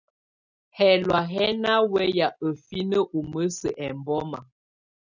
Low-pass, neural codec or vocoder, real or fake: 7.2 kHz; none; real